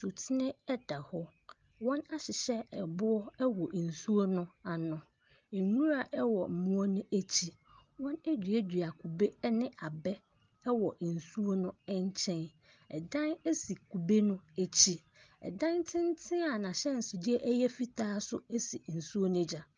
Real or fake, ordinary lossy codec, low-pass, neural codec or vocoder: real; Opus, 24 kbps; 7.2 kHz; none